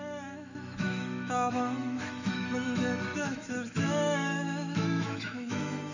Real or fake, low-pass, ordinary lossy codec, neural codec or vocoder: fake; 7.2 kHz; none; autoencoder, 48 kHz, 128 numbers a frame, DAC-VAE, trained on Japanese speech